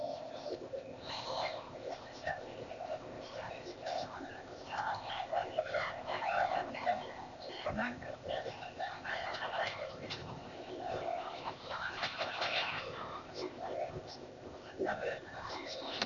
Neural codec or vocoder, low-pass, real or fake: codec, 16 kHz, 0.8 kbps, ZipCodec; 7.2 kHz; fake